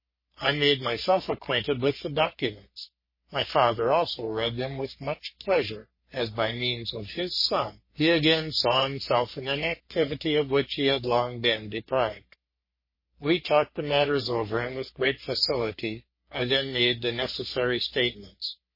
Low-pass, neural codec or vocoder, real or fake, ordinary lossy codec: 5.4 kHz; codec, 44.1 kHz, 3.4 kbps, Pupu-Codec; fake; MP3, 24 kbps